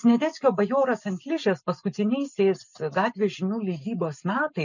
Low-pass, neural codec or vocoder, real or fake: 7.2 kHz; none; real